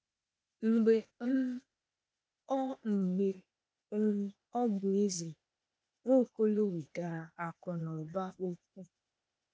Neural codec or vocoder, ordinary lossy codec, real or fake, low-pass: codec, 16 kHz, 0.8 kbps, ZipCodec; none; fake; none